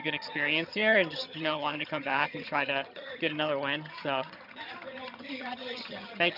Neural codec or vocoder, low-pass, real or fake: vocoder, 22.05 kHz, 80 mel bands, HiFi-GAN; 5.4 kHz; fake